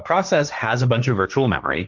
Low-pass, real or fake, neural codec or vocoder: 7.2 kHz; fake; codec, 16 kHz, 1.1 kbps, Voila-Tokenizer